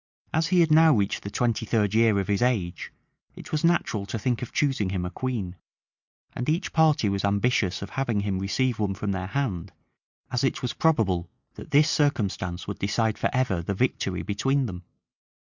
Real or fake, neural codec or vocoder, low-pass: real; none; 7.2 kHz